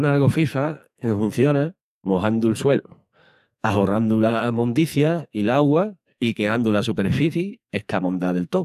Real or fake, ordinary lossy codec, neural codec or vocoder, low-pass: fake; none; codec, 44.1 kHz, 2.6 kbps, SNAC; 14.4 kHz